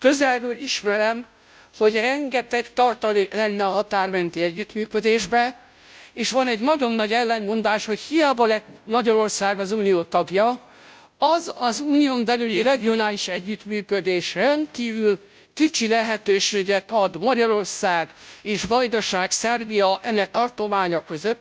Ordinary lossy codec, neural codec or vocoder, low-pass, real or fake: none; codec, 16 kHz, 0.5 kbps, FunCodec, trained on Chinese and English, 25 frames a second; none; fake